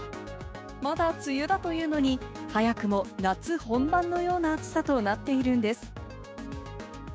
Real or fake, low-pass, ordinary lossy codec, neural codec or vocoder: fake; none; none; codec, 16 kHz, 6 kbps, DAC